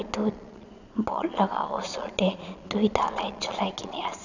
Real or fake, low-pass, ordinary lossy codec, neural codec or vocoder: fake; 7.2 kHz; none; vocoder, 22.05 kHz, 80 mel bands, Vocos